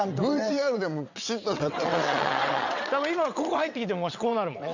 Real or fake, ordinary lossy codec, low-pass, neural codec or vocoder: fake; none; 7.2 kHz; vocoder, 22.05 kHz, 80 mel bands, WaveNeXt